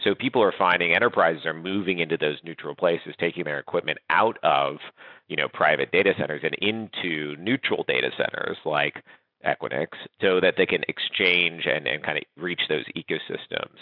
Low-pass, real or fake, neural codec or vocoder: 5.4 kHz; real; none